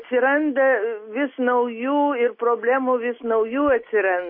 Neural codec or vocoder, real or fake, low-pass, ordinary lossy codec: none; real; 5.4 kHz; MP3, 24 kbps